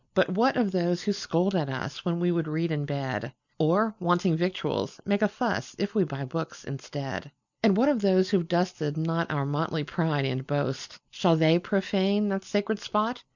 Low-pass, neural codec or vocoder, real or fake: 7.2 kHz; none; real